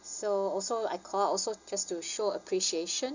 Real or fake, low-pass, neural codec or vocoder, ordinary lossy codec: real; 7.2 kHz; none; Opus, 64 kbps